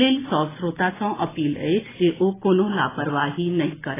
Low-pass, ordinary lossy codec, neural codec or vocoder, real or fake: 3.6 kHz; AAC, 16 kbps; none; real